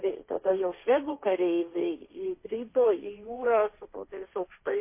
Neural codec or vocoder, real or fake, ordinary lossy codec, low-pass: codec, 16 kHz, 1.1 kbps, Voila-Tokenizer; fake; MP3, 24 kbps; 3.6 kHz